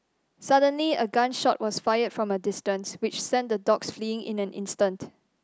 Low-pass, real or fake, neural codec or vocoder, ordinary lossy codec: none; real; none; none